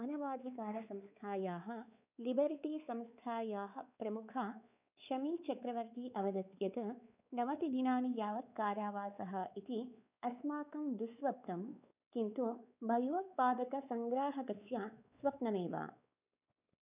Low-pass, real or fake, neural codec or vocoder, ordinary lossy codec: 3.6 kHz; fake; codec, 16 kHz, 4 kbps, X-Codec, WavLM features, trained on Multilingual LibriSpeech; none